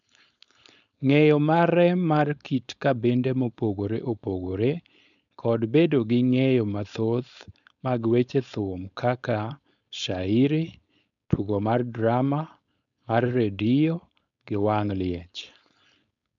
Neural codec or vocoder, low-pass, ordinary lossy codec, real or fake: codec, 16 kHz, 4.8 kbps, FACodec; 7.2 kHz; none; fake